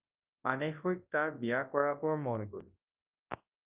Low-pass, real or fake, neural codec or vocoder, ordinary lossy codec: 3.6 kHz; fake; codec, 24 kHz, 0.9 kbps, WavTokenizer, large speech release; Opus, 32 kbps